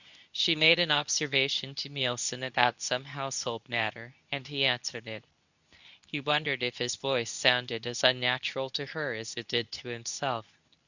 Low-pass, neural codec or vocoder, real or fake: 7.2 kHz; codec, 24 kHz, 0.9 kbps, WavTokenizer, medium speech release version 2; fake